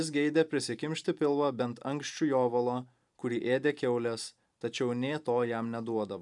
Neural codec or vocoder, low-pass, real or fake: vocoder, 44.1 kHz, 128 mel bands every 512 samples, BigVGAN v2; 10.8 kHz; fake